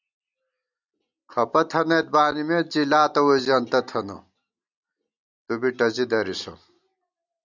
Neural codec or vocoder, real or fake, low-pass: none; real; 7.2 kHz